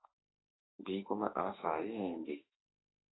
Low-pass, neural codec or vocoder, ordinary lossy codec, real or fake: 7.2 kHz; autoencoder, 48 kHz, 32 numbers a frame, DAC-VAE, trained on Japanese speech; AAC, 16 kbps; fake